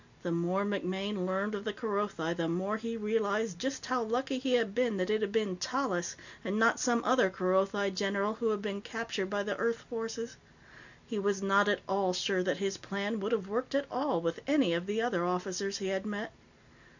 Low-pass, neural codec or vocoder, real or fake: 7.2 kHz; none; real